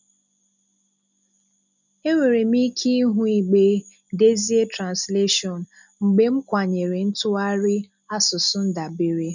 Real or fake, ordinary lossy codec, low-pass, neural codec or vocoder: real; none; 7.2 kHz; none